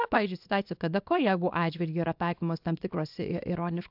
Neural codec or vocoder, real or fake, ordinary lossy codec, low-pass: codec, 24 kHz, 0.9 kbps, WavTokenizer, small release; fake; AAC, 48 kbps; 5.4 kHz